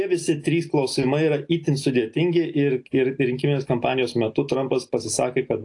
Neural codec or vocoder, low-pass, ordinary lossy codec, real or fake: none; 10.8 kHz; AAC, 48 kbps; real